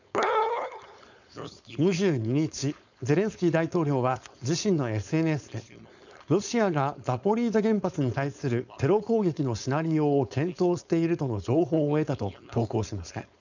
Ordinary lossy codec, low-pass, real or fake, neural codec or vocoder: none; 7.2 kHz; fake; codec, 16 kHz, 4.8 kbps, FACodec